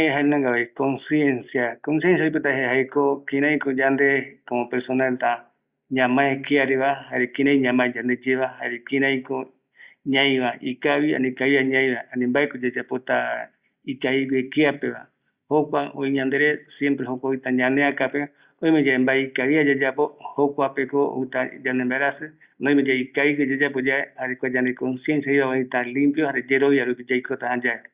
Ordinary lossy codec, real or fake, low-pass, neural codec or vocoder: Opus, 64 kbps; real; 3.6 kHz; none